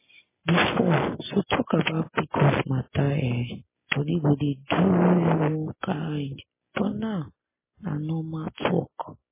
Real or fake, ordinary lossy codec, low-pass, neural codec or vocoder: real; MP3, 16 kbps; 3.6 kHz; none